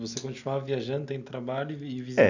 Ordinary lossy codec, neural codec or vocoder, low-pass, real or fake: none; none; 7.2 kHz; real